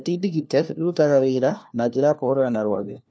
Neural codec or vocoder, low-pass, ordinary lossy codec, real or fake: codec, 16 kHz, 1 kbps, FunCodec, trained on LibriTTS, 50 frames a second; none; none; fake